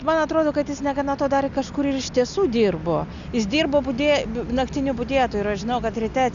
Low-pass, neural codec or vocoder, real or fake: 7.2 kHz; none; real